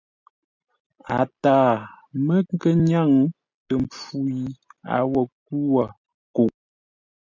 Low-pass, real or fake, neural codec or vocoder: 7.2 kHz; real; none